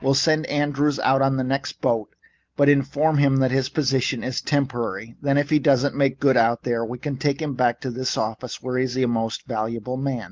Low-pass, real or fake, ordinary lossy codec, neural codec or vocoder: 7.2 kHz; real; Opus, 24 kbps; none